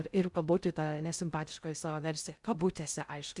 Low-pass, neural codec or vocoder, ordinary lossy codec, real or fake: 10.8 kHz; codec, 16 kHz in and 24 kHz out, 0.6 kbps, FocalCodec, streaming, 2048 codes; Opus, 64 kbps; fake